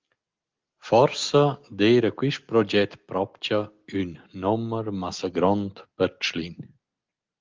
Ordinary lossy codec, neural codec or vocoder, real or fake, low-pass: Opus, 32 kbps; none; real; 7.2 kHz